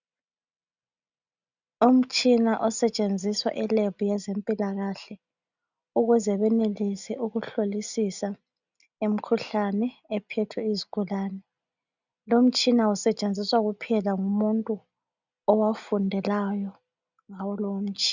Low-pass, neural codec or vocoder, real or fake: 7.2 kHz; none; real